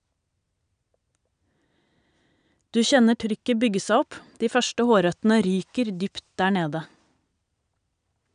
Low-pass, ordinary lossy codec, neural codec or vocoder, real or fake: 9.9 kHz; none; none; real